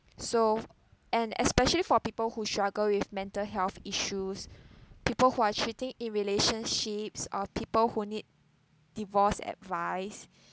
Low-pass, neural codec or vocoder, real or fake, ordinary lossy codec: none; none; real; none